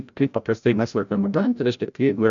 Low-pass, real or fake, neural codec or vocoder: 7.2 kHz; fake; codec, 16 kHz, 0.5 kbps, FreqCodec, larger model